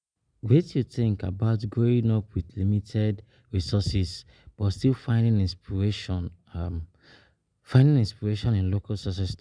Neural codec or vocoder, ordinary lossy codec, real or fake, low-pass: none; none; real; 9.9 kHz